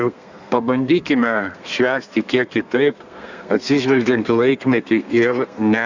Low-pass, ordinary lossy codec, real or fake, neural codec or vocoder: 7.2 kHz; Opus, 64 kbps; fake; codec, 32 kHz, 1.9 kbps, SNAC